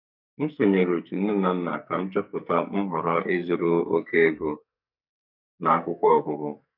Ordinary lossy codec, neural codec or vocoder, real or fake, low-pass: none; codec, 44.1 kHz, 2.6 kbps, SNAC; fake; 5.4 kHz